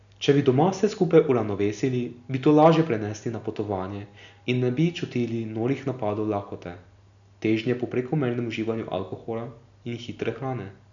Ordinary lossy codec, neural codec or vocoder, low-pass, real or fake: none; none; 7.2 kHz; real